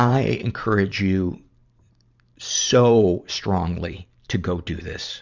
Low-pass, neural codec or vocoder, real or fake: 7.2 kHz; vocoder, 44.1 kHz, 128 mel bands every 512 samples, BigVGAN v2; fake